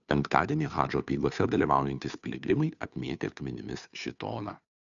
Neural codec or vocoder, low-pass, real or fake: codec, 16 kHz, 2 kbps, FunCodec, trained on Chinese and English, 25 frames a second; 7.2 kHz; fake